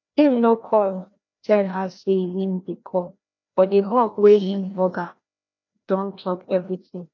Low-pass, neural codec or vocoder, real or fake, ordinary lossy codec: 7.2 kHz; codec, 16 kHz, 1 kbps, FreqCodec, larger model; fake; none